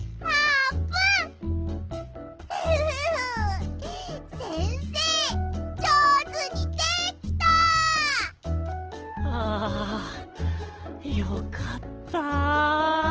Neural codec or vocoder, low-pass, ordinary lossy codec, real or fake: none; 7.2 kHz; Opus, 16 kbps; real